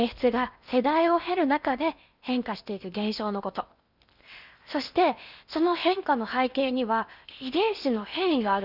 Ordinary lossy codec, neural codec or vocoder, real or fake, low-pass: Opus, 64 kbps; codec, 16 kHz in and 24 kHz out, 0.8 kbps, FocalCodec, streaming, 65536 codes; fake; 5.4 kHz